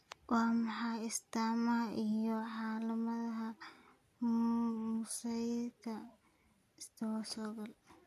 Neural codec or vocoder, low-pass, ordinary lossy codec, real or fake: none; 14.4 kHz; MP3, 96 kbps; real